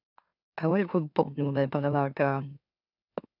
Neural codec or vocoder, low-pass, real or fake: autoencoder, 44.1 kHz, a latent of 192 numbers a frame, MeloTTS; 5.4 kHz; fake